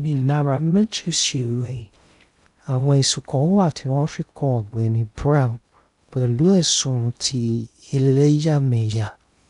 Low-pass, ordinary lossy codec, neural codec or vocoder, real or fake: 10.8 kHz; none; codec, 16 kHz in and 24 kHz out, 0.6 kbps, FocalCodec, streaming, 2048 codes; fake